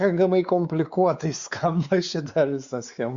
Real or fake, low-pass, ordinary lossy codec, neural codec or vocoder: fake; 7.2 kHz; Opus, 64 kbps; codec, 16 kHz, 4 kbps, X-Codec, WavLM features, trained on Multilingual LibriSpeech